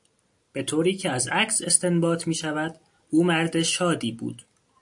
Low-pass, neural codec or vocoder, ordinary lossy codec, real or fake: 10.8 kHz; none; AAC, 64 kbps; real